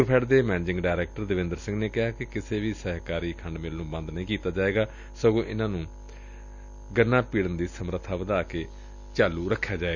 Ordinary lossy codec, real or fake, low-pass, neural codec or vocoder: none; real; 7.2 kHz; none